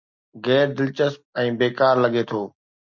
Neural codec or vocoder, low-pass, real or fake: none; 7.2 kHz; real